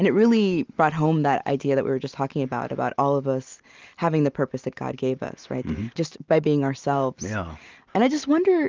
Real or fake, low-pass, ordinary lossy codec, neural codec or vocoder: real; 7.2 kHz; Opus, 32 kbps; none